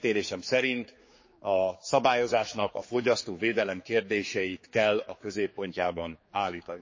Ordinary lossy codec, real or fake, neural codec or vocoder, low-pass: MP3, 32 kbps; fake; codec, 16 kHz, 4 kbps, X-Codec, HuBERT features, trained on general audio; 7.2 kHz